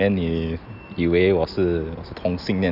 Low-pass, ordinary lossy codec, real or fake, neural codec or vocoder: 5.4 kHz; none; real; none